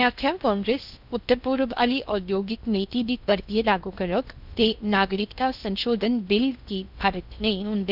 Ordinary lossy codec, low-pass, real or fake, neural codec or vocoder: none; 5.4 kHz; fake; codec, 16 kHz in and 24 kHz out, 0.6 kbps, FocalCodec, streaming, 2048 codes